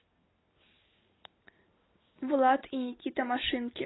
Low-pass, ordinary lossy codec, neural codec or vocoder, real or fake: 7.2 kHz; AAC, 16 kbps; none; real